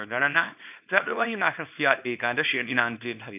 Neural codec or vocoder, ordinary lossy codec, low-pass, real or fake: codec, 24 kHz, 0.9 kbps, WavTokenizer, small release; AAC, 32 kbps; 3.6 kHz; fake